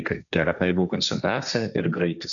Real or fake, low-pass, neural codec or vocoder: fake; 7.2 kHz; codec, 16 kHz, 1.1 kbps, Voila-Tokenizer